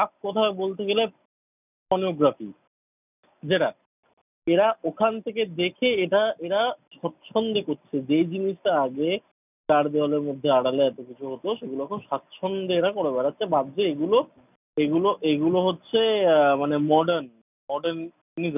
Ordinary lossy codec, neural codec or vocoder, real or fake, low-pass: none; none; real; 3.6 kHz